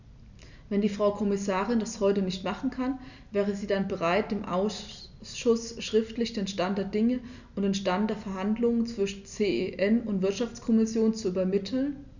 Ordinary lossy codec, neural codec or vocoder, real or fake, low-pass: none; none; real; 7.2 kHz